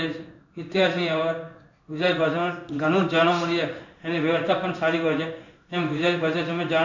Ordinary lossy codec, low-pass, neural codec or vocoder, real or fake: none; 7.2 kHz; codec, 16 kHz in and 24 kHz out, 1 kbps, XY-Tokenizer; fake